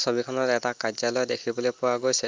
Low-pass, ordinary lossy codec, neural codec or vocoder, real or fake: 7.2 kHz; Opus, 32 kbps; none; real